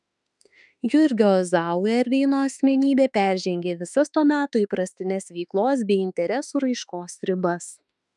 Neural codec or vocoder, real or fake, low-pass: autoencoder, 48 kHz, 32 numbers a frame, DAC-VAE, trained on Japanese speech; fake; 10.8 kHz